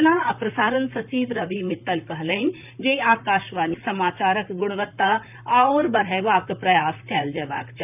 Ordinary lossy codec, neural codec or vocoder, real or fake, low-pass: none; vocoder, 44.1 kHz, 128 mel bands, Pupu-Vocoder; fake; 3.6 kHz